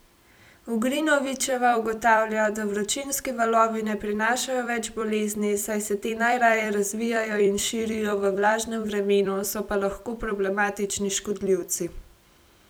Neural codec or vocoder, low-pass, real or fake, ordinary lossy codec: vocoder, 44.1 kHz, 128 mel bands every 256 samples, BigVGAN v2; none; fake; none